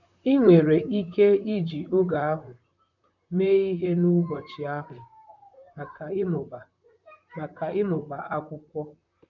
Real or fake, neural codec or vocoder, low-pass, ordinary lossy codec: fake; vocoder, 44.1 kHz, 128 mel bands, Pupu-Vocoder; 7.2 kHz; none